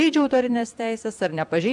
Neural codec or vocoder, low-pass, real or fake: vocoder, 44.1 kHz, 128 mel bands every 256 samples, BigVGAN v2; 10.8 kHz; fake